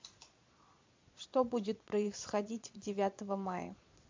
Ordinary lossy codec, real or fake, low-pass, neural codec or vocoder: MP3, 48 kbps; fake; 7.2 kHz; vocoder, 22.05 kHz, 80 mel bands, Vocos